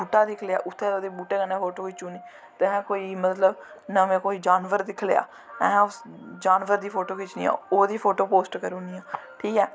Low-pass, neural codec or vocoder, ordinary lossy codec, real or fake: none; none; none; real